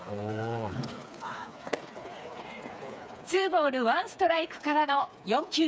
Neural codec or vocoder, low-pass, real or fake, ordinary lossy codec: codec, 16 kHz, 4 kbps, FreqCodec, smaller model; none; fake; none